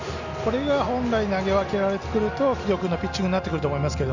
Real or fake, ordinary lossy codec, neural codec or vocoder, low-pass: real; none; none; 7.2 kHz